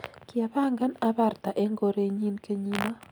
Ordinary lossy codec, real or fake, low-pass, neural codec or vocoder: none; real; none; none